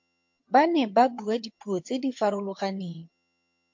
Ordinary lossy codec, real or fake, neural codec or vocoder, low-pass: MP3, 48 kbps; fake; vocoder, 22.05 kHz, 80 mel bands, HiFi-GAN; 7.2 kHz